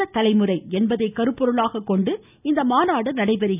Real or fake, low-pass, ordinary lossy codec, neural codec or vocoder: real; 3.6 kHz; none; none